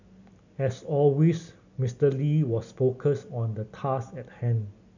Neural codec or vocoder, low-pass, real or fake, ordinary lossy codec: none; 7.2 kHz; real; none